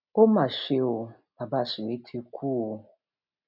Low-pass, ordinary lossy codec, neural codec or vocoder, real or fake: 5.4 kHz; none; none; real